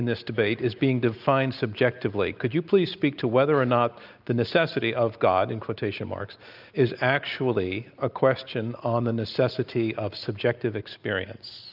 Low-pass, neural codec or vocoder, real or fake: 5.4 kHz; none; real